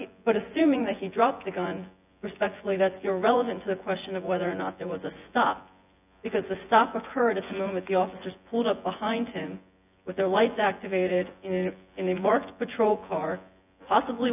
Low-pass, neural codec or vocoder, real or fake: 3.6 kHz; vocoder, 24 kHz, 100 mel bands, Vocos; fake